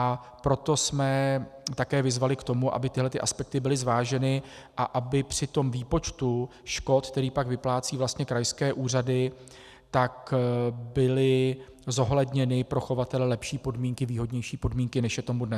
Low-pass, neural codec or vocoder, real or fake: 14.4 kHz; none; real